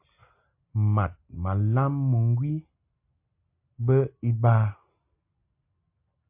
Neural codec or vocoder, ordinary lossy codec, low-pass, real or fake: none; MP3, 32 kbps; 3.6 kHz; real